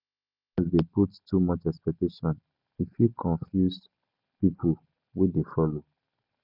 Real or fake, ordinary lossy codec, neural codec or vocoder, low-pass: real; none; none; 5.4 kHz